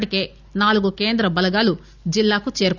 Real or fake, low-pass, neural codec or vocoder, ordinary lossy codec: real; 7.2 kHz; none; none